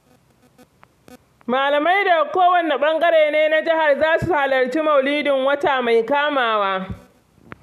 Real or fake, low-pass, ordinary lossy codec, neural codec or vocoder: real; 14.4 kHz; none; none